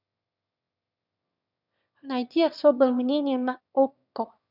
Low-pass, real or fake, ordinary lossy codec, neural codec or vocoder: 5.4 kHz; fake; none; autoencoder, 22.05 kHz, a latent of 192 numbers a frame, VITS, trained on one speaker